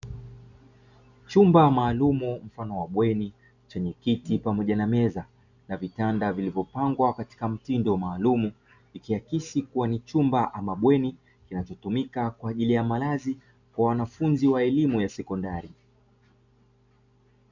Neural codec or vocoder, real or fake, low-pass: none; real; 7.2 kHz